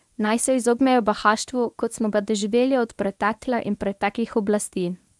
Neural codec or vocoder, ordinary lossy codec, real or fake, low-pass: codec, 24 kHz, 0.9 kbps, WavTokenizer, medium speech release version 1; none; fake; none